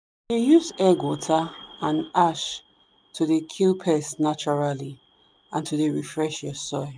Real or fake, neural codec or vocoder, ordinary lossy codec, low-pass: real; none; none; none